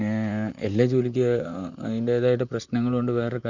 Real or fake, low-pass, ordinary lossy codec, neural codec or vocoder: fake; 7.2 kHz; none; vocoder, 44.1 kHz, 128 mel bands, Pupu-Vocoder